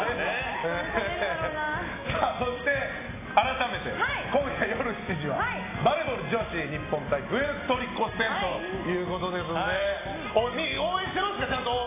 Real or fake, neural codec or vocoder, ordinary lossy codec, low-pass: real; none; AAC, 16 kbps; 3.6 kHz